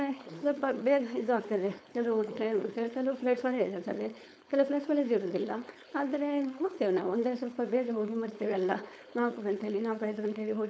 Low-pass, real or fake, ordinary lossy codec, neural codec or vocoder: none; fake; none; codec, 16 kHz, 4.8 kbps, FACodec